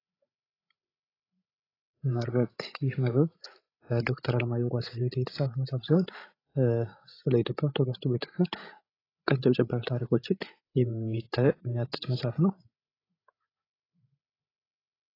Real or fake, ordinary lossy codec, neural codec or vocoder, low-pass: fake; AAC, 24 kbps; codec, 16 kHz, 16 kbps, FreqCodec, larger model; 5.4 kHz